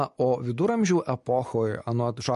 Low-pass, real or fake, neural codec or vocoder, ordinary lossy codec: 14.4 kHz; real; none; MP3, 48 kbps